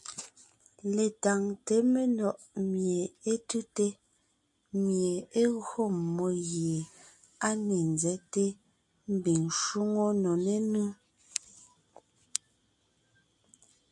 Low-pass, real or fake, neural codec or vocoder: 10.8 kHz; real; none